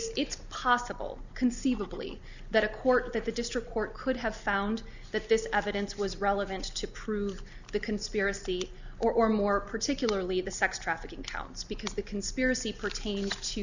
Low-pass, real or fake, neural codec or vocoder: 7.2 kHz; real; none